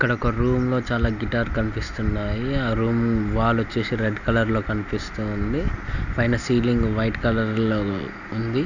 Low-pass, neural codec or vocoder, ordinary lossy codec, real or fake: 7.2 kHz; none; none; real